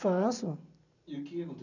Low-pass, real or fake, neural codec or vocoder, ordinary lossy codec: 7.2 kHz; real; none; none